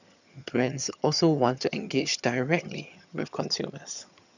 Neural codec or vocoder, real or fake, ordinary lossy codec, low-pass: vocoder, 22.05 kHz, 80 mel bands, HiFi-GAN; fake; none; 7.2 kHz